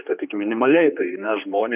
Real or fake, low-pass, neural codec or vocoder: fake; 3.6 kHz; codec, 16 kHz, 2 kbps, X-Codec, HuBERT features, trained on general audio